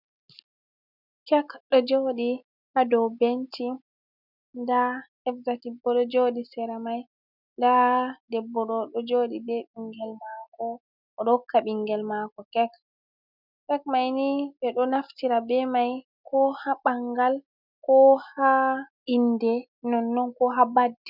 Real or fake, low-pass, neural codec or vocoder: real; 5.4 kHz; none